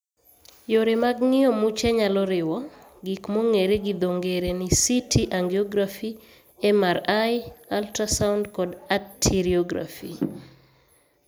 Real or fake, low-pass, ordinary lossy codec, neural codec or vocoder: real; none; none; none